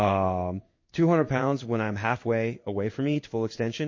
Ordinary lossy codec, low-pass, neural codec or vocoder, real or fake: MP3, 32 kbps; 7.2 kHz; codec, 16 kHz in and 24 kHz out, 1 kbps, XY-Tokenizer; fake